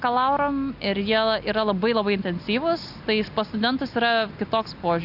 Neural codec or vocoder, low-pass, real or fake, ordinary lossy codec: none; 5.4 kHz; real; AAC, 48 kbps